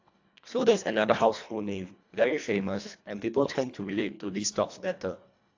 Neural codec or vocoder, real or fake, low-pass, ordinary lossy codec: codec, 24 kHz, 1.5 kbps, HILCodec; fake; 7.2 kHz; AAC, 48 kbps